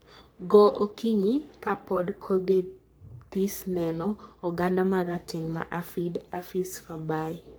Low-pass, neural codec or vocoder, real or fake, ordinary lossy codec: none; codec, 44.1 kHz, 3.4 kbps, Pupu-Codec; fake; none